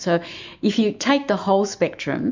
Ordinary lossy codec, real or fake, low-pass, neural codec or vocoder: MP3, 64 kbps; fake; 7.2 kHz; autoencoder, 48 kHz, 128 numbers a frame, DAC-VAE, trained on Japanese speech